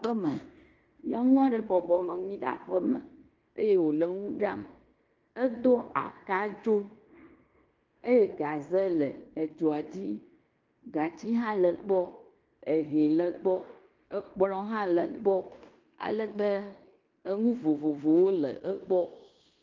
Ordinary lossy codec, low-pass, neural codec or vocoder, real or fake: Opus, 24 kbps; 7.2 kHz; codec, 16 kHz in and 24 kHz out, 0.9 kbps, LongCat-Audio-Codec, fine tuned four codebook decoder; fake